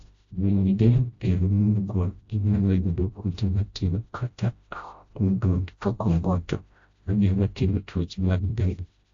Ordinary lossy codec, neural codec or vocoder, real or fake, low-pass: AAC, 48 kbps; codec, 16 kHz, 0.5 kbps, FreqCodec, smaller model; fake; 7.2 kHz